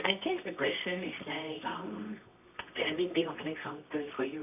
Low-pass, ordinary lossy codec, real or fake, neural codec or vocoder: 3.6 kHz; none; fake; codec, 24 kHz, 0.9 kbps, WavTokenizer, medium music audio release